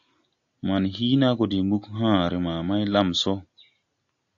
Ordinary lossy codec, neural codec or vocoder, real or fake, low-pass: AAC, 64 kbps; none; real; 7.2 kHz